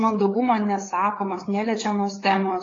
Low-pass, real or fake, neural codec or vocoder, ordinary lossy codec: 7.2 kHz; fake; codec, 16 kHz, 4 kbps, FreqCodec, larger model; AAC, 32 kbps